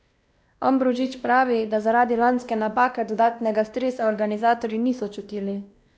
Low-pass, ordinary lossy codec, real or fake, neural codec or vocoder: none; none; fake; codec, 16 kHz, 1 kbps, X-Codec, WavLM features, trained on Multilingual LibriSpeech